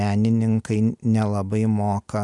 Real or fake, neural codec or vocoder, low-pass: real; none; 10.8 kHz